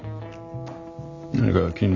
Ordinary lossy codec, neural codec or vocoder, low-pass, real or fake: none; none; 7.2 kHz; real